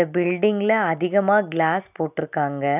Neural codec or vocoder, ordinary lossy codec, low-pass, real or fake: none; none; 3.6 kHz; real